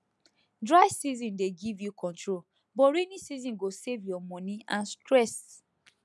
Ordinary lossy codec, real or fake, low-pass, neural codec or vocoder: none; real; none; none